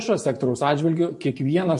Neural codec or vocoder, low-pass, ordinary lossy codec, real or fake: vocoder, 24 kHz, 100 mel bands, Vocos; 10.8 kHz; MP3, 48 kbps; fake